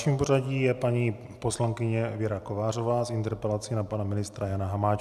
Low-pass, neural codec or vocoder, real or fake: 14.4 kHz; none; real